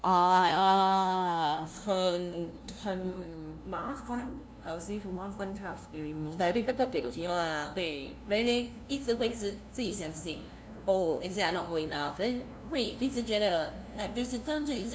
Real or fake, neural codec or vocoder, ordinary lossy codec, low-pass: fake; codec, 16 kHz, 0.5 kbps, FunCodec, trained on LibriTTS, 25 frames a second; none; none